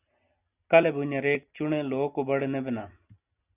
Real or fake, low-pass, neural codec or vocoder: real; 3.6 kHz; none